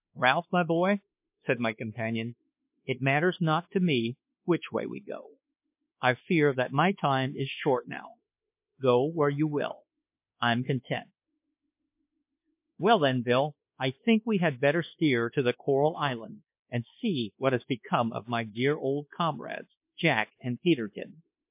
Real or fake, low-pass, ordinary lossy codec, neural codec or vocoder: fake; 3.6 kHz; MP3, 32 kbps; codec, 24 kHz, 1.2 kbps, DualCodec